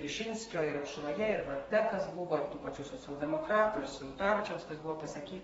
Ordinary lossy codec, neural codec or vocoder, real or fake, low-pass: AAC, 24 kbps; codec, 32 kHz, 1.9 kbps, SNAC; fake; 14.4 kHz